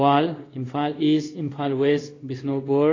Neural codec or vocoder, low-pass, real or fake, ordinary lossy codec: codec, 16 kHz in and 24 kHz out, 1 kbps, XY-Tokenizer; 7.2 kHz; fake; AAC, 32 kbps